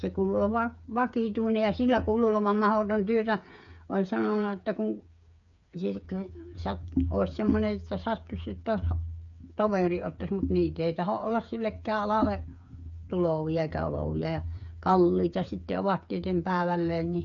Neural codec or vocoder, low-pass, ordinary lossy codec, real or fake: codec, 16 kHz, 8 kbps, FreqCodec, smaller model; 7.2 kHz; none; fake